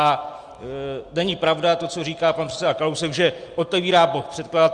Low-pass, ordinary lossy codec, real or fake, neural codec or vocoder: 10.8 kHz; Opus, 32 kbps; real; none